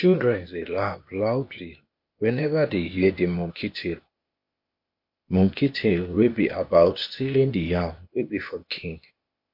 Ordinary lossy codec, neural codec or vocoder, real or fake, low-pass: MP3, 32 kbps; codec, 16 kHz, 0.8 kbps, ZipCodec; fake; 5.4 kHz